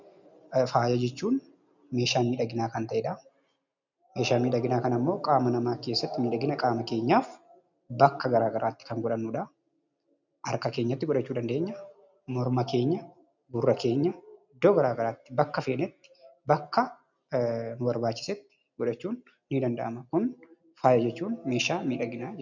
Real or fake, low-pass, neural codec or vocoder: real; 7.2 kHz; none